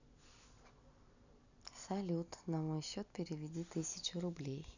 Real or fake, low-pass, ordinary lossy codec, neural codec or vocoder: real; 7.2 kHz; none; none